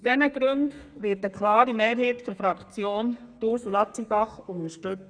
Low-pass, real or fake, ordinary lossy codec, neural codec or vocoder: 9.9 kHz; fake; none; codec, 32 kHz, 1.9 kbps, SNAC